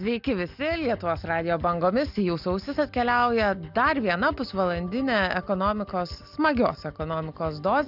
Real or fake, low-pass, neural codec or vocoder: real; 5.4 kHz; none